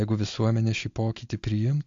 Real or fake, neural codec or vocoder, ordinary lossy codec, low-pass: real; none; AAC, 64 kbps; 7.2 kHz